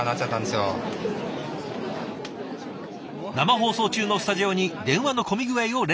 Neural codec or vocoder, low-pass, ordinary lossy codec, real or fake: none; none; none; real